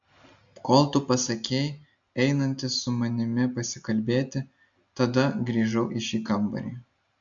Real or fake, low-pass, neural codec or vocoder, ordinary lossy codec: real; 7.2 kHz; none; AAC, 64 kbps